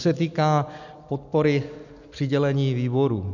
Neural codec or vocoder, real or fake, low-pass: none; real; 7.2 kHz